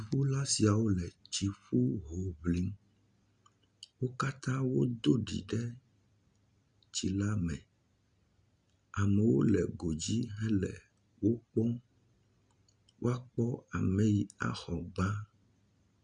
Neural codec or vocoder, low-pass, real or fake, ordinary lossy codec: none; 9.9 kHz; real; Opus, 64 kbps